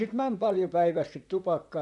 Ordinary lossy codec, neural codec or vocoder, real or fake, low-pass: none; vocoder, 44.1 kHz, 128 mel bands, Pupu-Vocoder; fake; 10.8 kHz